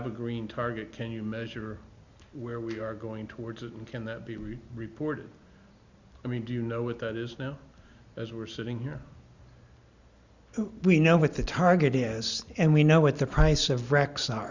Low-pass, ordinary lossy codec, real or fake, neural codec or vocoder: 7.2 kHz; Opus, 64 kbps; real; none